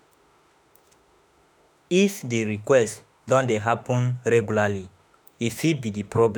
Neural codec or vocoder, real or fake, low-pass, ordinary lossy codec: autoencoder, 48 kHz, 32 numbers a frame, DAC-VAE, trained on Japanese speech; fake; none; none